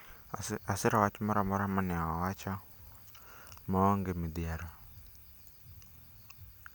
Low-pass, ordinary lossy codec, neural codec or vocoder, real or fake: none; none; none; real